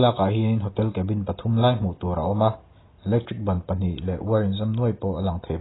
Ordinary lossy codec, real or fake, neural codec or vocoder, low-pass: AAC, 16 kbps; real; none; 7.2 kHz